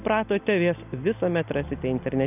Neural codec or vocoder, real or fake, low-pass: none; real; 3.6 kHz